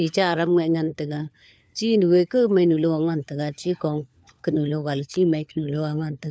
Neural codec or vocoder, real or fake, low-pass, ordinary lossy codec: codec, 16 kHz, 4 kbps, FunCodec, trained on LibriTTS, 50 frames a second; fake; none; none